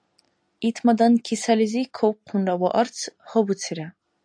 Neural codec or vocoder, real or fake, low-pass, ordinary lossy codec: none; real; 9.9 kHz; AAC, 64 kbps